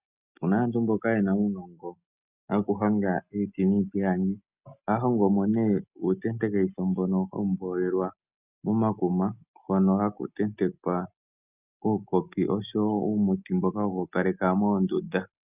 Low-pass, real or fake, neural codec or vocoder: 3.6 kHz; real; none